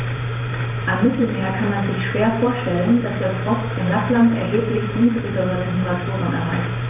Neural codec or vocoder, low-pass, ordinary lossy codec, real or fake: vocoder, 44.1 kHz, 128 mel bands every 512 samples, BigVGAN v2; 3.6 kHz; none; fake